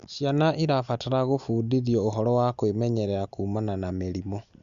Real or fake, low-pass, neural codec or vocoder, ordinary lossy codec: real; 7.2 kHz; none; Opus, 64 kbps